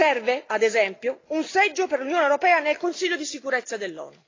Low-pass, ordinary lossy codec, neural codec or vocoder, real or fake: 7.2 kHz; AAC, 32 kbps; none; real